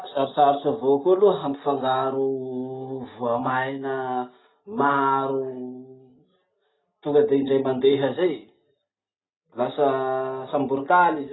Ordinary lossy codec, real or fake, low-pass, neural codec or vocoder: AAC, 16 kbps; real; 7.2 kHz; none